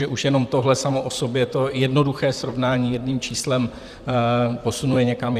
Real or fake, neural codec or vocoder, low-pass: fake; vocoder, 44.1 kHz, 128 mel bands, Pupu-Vocoder; 14.4 kHz